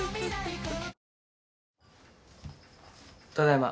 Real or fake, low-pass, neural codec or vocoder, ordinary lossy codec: real; none; none; none